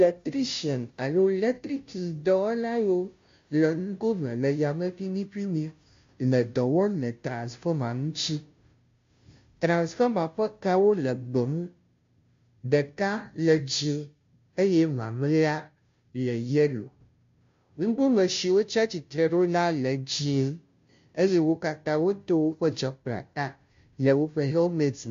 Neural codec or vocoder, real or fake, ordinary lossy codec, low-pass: codec, 16 kHz, 0.5 kbps, FunCodec, trained on Chinese and English, 25 frames a second; fake; MP3, 48 kbps; 7.2 kHz